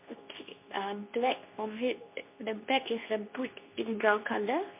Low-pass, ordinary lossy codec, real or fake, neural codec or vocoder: 3.6 kHz; MP3, 24 kbps; fake; codec, 24 kHz, 0.9 kbps, WavTokenizer, medium speech release version 2